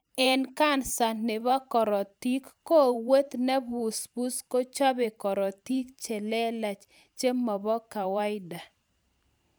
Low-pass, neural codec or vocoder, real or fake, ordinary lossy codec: none; vocoder, 44.1 kHz, 128 mel bands every 512 samples, BigVGAN v2; fake; none